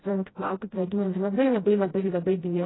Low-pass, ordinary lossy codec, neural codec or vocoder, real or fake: 7.2 kHz; AAC, 16 kbps; codec, 16 kHz, 0.5 kbps, FreqCodec, smaller model; fake